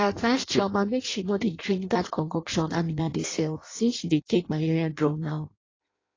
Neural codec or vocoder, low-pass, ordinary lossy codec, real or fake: codec, 16 kHz in and 24 kHz out, 0.6 kbps, FireRedTTS-2 codec; 7.2 kHz; AAC, 32 kbps; fake